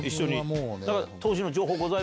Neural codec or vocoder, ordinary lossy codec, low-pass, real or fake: none; none; none; real